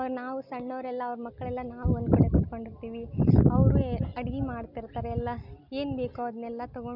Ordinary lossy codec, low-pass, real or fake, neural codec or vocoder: none; 5.4 kHz; real; none